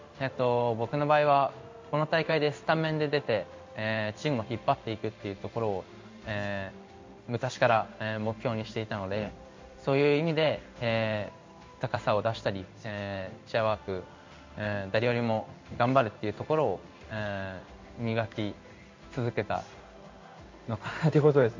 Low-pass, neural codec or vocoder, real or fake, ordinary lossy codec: 7.2 kHz; codec, 16 kHz in and 24 kHz out, 1 kbps, XY-Tokenizer; fake; MP3, 64 kbps